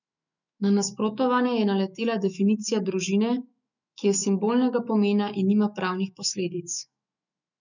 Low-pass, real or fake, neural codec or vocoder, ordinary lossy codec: 7.2 kHz; fake; autoencoder, 48 kHz, 128 numbers a frame, DAC-VAE, trained on Japanese speech; none